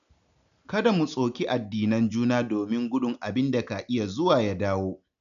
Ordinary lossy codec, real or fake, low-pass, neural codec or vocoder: none; real; 7.2 kHz; none